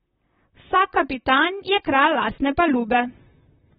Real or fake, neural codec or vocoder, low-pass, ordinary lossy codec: fake; vocoder, 44.1 kHz, 128 mel bands, Pupu-Vocoder; 19.8 kHz; AAC, 16 kbps